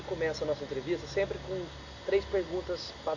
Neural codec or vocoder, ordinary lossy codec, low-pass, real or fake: none; none; 7.2 kHz; real